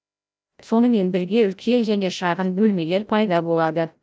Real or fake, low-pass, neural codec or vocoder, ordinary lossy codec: fake; none; codec, 16 kHz, 0.5 kbps, FreqCodec, larger model; none